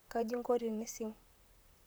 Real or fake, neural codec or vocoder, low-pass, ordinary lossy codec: fake; vocoder, 44.1 kHz, 128 mel bands, Pupu-Vocoder; none; none